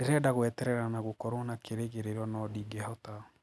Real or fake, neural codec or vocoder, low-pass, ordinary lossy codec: real; none; none; none